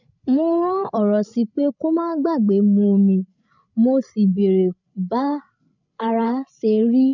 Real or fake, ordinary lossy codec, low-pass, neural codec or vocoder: fake; none; 7.2 kHz; codec, 16 kHz, 8 kbps, FreqCodec, larger model